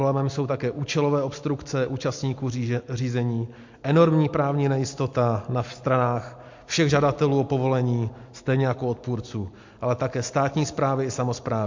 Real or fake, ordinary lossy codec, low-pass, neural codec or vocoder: real; MP3, 48 kbps; 7.2 kHz; none